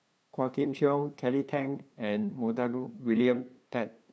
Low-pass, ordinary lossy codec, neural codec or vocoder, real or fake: none; none; codec, 16 kHz, 2 kbps, FunCodec, trained on LibriTTS, 25 frames a second; fake